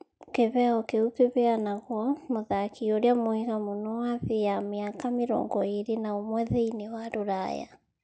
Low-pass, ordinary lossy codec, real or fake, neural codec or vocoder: none; none; real; none